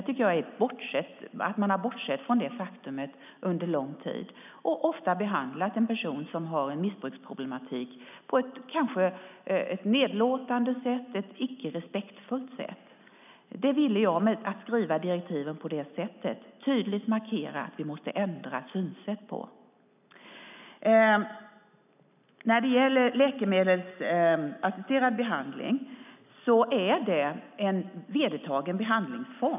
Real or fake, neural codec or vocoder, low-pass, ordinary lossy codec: real; none; 3.6 kHz; none